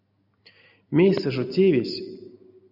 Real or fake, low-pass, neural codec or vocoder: real; 5.4 kHz; none